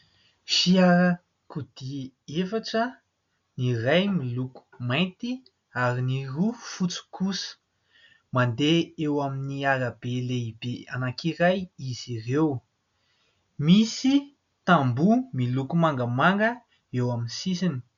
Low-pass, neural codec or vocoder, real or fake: 7.2 kHz; none; real